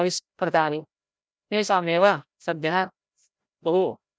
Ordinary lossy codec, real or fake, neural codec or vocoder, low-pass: none; fake; codec, 16 kHz, 0.5 kbps, FreqCodec, larger model; none